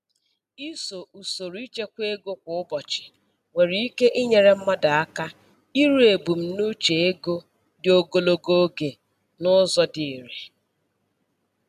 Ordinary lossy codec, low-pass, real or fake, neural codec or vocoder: none; 14.4 kHz; real; none